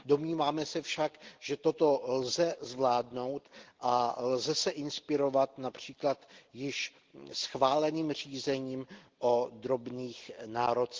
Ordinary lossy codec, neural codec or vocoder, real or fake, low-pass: Opus, 16 kbps; none; real; 7.2 kHz